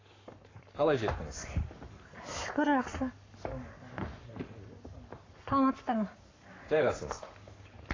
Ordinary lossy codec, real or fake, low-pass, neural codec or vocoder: AAC, 32 kbps; fake; 7.2 kHz; codec, 44.1 kHz, 7.8 kbps, Pupu-Codec